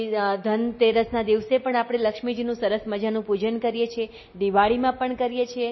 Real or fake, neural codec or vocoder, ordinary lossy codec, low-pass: real; none; MP3, 24 kbps; 7.2 kHz